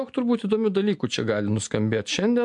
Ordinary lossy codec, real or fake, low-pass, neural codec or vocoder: MP3, 64 kbps; real; 10.8 kHz; none